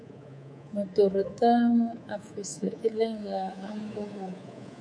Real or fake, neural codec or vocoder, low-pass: fake; codec, 24 kHz, 3.1 kbps, DualCodec; 9.9 kHz